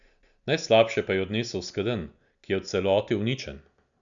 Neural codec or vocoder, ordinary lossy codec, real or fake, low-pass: none; none; real; 7.2 kHz